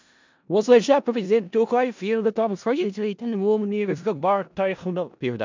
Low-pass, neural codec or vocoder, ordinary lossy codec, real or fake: 7.2 kHz; codec, 16 kHz in and 24 kHz out, 0.4 kbps, LongCat-Audio-Codec, four codebook decoder; MP3, 64 kbps; fake